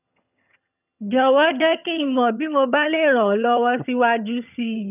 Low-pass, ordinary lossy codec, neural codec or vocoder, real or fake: 3.6 kHz; none; vocoder, 22.05 kHz, 80 mel bands, HiFi-GAN; fake